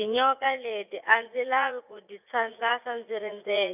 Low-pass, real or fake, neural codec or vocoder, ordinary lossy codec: 3.6 kHz; fake; vocoder, 44.1 kHz, 80 mel bands, Vocos; none